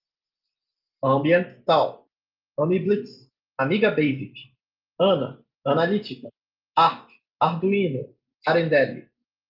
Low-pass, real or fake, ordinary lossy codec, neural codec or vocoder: 5.4 kHz; real; Opus, 32 kbps; none